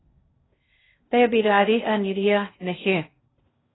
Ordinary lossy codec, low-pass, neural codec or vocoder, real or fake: AAC, 16 kbps; 7.2 kHz; codec, 16 kHz in and 24 kHz out, 0.6 kbps, FocalCodec, streaming, 2048 codes; fake